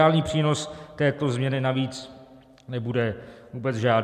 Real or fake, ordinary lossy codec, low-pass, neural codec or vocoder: real; MP3, 96 kbps; 14.4 kHz; none